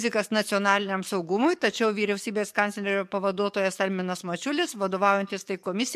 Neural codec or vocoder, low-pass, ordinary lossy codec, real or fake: codec, 44.1 kHz, 7.8 kbps, DAC; 14.4 kHz; MP3, 64 kbps; fake